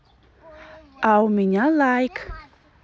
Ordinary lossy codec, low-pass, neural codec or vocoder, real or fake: none; none; none; real